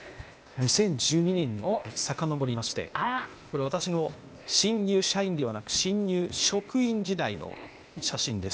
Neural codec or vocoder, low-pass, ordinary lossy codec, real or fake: codec, 16 kHz, 0.8 kbps, ZipCodec; none; none; fake